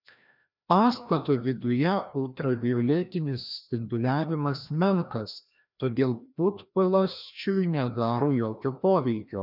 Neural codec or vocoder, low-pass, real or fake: codec, 16 kHz, 1 kbps, FreqCodec, larger model; 5.4 kHz; fake